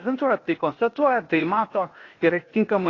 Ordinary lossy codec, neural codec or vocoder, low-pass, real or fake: AAC, 32 kbps; codec, 16 kHz, 0.8 kbps, ZipCodec; 7.2 kHz; fake